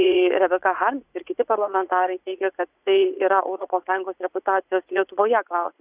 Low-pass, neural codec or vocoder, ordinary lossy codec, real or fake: 3.6 kHz; vocoder, 44.1 kHz, 80 mel bands, Vocos; Opus, 64 kbps; fake